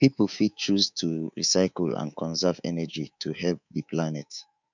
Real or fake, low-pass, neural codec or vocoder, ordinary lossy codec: fake; 7.2 kHz; codec, 24 kHz, 3.1 kbps, DualCodec; none